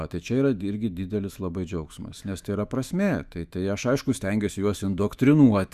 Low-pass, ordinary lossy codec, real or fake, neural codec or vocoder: 14.4 kHz; Opus, 64 kbps; fake; autoencoder, 48 kHz, 128 numbers a frame, DAC-VAE, trained on Japanese speech